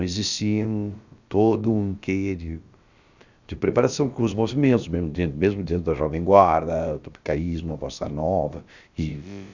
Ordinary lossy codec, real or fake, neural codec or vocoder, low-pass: Opus, 64 kbps; fake; codec, 16 kHz, about 1 kbps, DyCAST, with the encoder's durations; 7.2 kHz